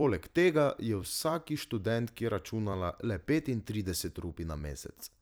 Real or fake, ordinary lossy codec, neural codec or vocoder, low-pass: fake; none; vocoder, 44.1 kHz, 128 mel bands every 256 samples, BigVGAN v2; none